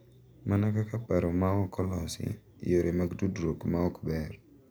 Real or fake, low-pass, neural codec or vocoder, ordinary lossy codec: real; none; none; none